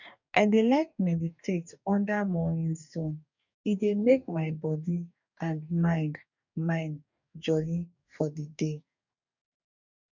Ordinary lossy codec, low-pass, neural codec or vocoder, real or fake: none; 7.2 kHz; codec, 44.1 kHz, 2.6 kbps, DAC; fake